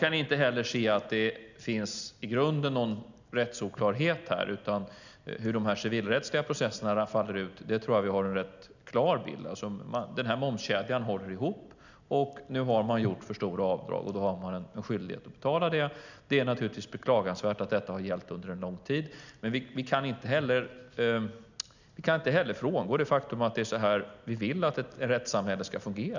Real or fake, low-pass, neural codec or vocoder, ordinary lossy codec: real; 7.2 kHz; none; none